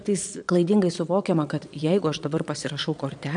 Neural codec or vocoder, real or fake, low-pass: vocoder, 22.05 kHz, 80 mel bands, Vocos; fake; 9.9 kHz